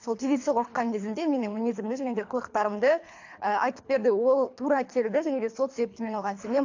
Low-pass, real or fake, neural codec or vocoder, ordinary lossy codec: 7.2 kHz; fake; codec, 24 kHz, 3 kbps, HILCodec; AAC, 48 kbps